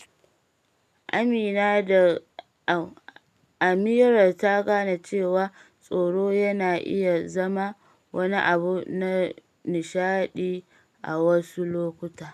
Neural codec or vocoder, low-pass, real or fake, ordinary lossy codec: none; 14.4 kHz; real; MP3, 96 kbps